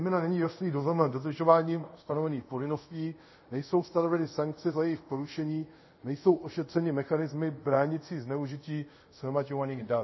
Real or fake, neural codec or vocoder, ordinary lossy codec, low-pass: fake; codec, 24 kHz, 0.5 kbps, DualCodec; MP3, 24 kbps; 7.2 kHz